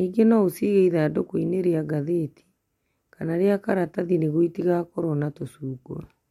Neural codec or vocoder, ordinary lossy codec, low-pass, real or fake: none; MP3, 64 kbps; 19.8 kHz; real